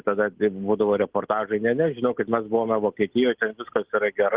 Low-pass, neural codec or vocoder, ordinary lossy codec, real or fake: 3.6 kHz; none; Opus, 16 kbps; real